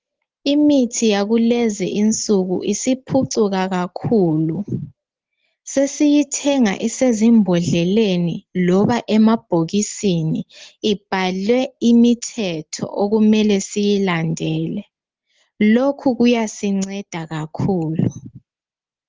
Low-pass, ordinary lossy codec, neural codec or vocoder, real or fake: 7.2 kHz; Opus, 16 kbps; none; real